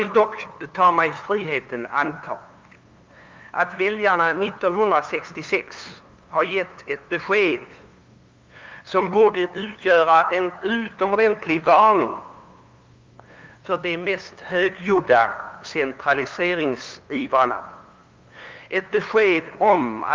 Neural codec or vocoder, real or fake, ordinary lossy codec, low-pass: codec, 16 kHz, 2 kbps, FunCodec, trained on LibriTTS, 25 frames a second; fake; Opus, 32 kbps; 7.2 kHz